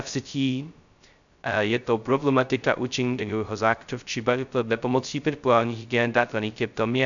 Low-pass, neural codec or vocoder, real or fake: 7.2 kHz; codec, 16 kHz, 0.2 kbps, FocalCodec; fake